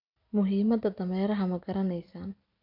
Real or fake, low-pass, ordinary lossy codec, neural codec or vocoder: real; 5.4 kHz; none; none